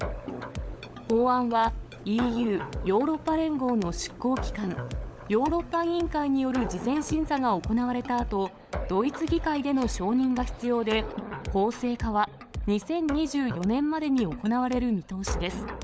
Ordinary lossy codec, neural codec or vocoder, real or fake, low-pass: none; codec, 16 kHz, 16 kbps, FunCodec, trained on LibriTTS, 50 frames a second; fake; none